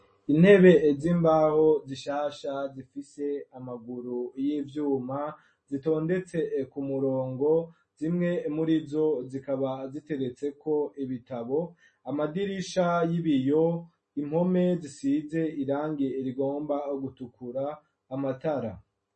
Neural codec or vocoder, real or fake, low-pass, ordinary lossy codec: none; real; 10.8 kHz; MP3, 32 kbps